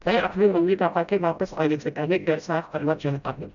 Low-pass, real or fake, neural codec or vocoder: 7.2 kHz; fake; codec, 16 kHz, 0.5 kbps, FreqCodec, smaller model